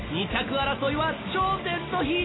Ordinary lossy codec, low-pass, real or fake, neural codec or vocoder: AAC, 16 kbps; 7.2 kHz; real; none